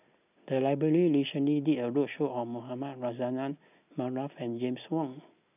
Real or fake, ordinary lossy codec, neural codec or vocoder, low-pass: real; none; none; 3.6 kHz